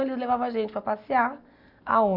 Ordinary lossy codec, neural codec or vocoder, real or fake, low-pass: none; none; real; 5.4 kHz